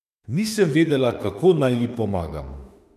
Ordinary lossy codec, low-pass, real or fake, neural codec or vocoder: none; 14.4 kHz; fake; autoencoder, 48 kHz, 32 numbers a frame, DAC-VAE, trained on Japanese speech